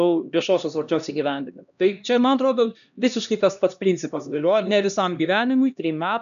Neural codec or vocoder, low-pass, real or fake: codec, 16 kHz, 1 kbps, X-Codec, HuBERT features, trained on LibriSpeech; 7.2 kHz; fake